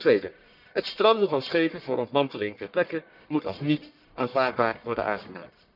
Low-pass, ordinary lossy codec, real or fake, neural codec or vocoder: 5.4 kHz; none; fake; codec, 44.1 kHz, 1.7 kbps, Pupu-Codec